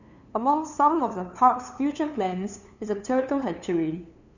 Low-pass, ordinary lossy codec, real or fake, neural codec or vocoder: 7.2 kHz; none; fake; codec, 16 kHz, 2 kbps, FunCodec, trained on LibriTTS, 25 frames a second